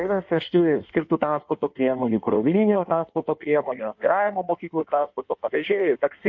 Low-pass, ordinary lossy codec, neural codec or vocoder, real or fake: 7.2 kHz; MP3, 48 kbps; codec, 16 kHz in and 24 kHz out, 1.1 kbps, FireRedTTS-2 codec; fake